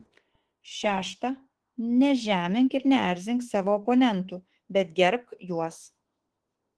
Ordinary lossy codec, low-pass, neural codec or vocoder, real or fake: Opus, 16 kbps; 10.8 kHz; autoencoder, 48 kHz, 32 numbers a frame, DAC-VAE, trained on Japanese speech; fake